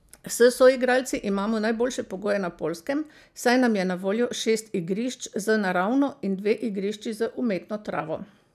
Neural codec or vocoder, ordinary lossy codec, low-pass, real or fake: none; none; 14.4 kHz; real